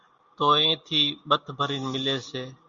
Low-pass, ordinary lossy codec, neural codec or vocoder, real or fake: 7.2 kHz; Opus, 64 kbps; none; real